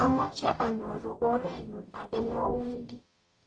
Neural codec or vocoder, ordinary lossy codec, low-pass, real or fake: codec, 44.1 kHz, 0.9 kbps, DAC; AAC, 32 kbps; 9.9 kHz; fake